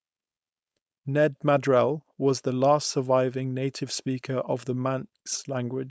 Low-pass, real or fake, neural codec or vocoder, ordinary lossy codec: none; fake; codec, 16 kHz, 4.8 kbps, FACodec; none